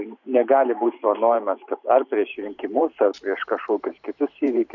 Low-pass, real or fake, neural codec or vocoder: 7.2 kHz; fake; vocoder, 44.1 kHz, 128 mel bands every 512 samples, BigVGAN v2